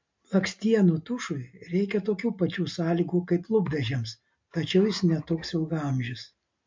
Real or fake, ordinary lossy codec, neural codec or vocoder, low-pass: real; MP3, 48 kbps; none; 7.2 kHz